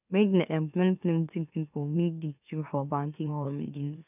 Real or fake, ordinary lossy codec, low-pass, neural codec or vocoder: fake; none; 3.6 kHz; autoencoder, 44.1 kHz, a latent of 192 numbers a frame, MeloTTS